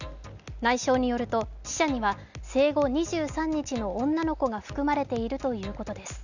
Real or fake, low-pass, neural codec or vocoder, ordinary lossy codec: real; 7.2 kHz; none; none